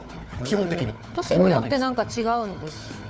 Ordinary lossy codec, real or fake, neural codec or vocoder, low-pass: none; fake; codec, 16 kHz, 4 kbps, FunCodec, trained on Chinese and English, 50 frames a second; none